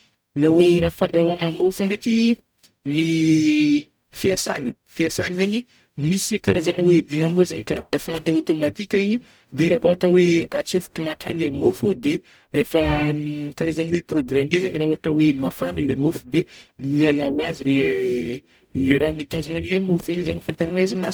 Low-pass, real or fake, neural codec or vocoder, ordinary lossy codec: none; fake; codec, 44.1 kHz, 0.9 kbps, DAC; none